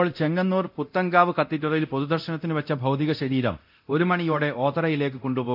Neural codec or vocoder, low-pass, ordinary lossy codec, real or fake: codec, 24 kHz, 0.9 kbps, DualCodec; 5.4 kHz; none; fake